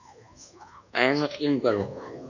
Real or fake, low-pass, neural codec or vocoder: fake; 7.2 kHz; codec, 24 kHz, 1.2 kbps, DualCodec